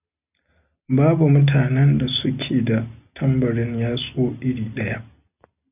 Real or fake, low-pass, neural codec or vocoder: real; 3.6 kHz; none